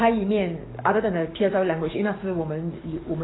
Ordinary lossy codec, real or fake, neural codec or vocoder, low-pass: AAC, 16 kbps; fake; codec, 44.1 kHz, 7.8 kbps, DAC; 7.2 kHz